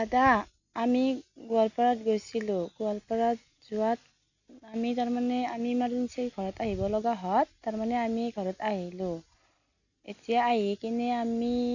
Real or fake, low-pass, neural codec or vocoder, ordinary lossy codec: real; 7.2 kHz; none; none